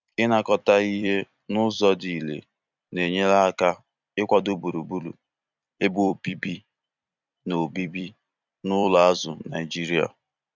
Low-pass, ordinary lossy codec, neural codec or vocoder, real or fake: 7.2 kHz; none; vocoder, 44.1 kHz, 128 mel bands every 256 samples, BigVGAN v2; fake